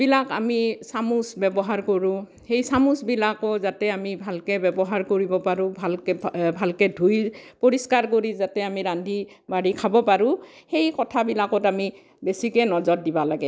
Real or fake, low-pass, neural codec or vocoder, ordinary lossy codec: real; none; none; none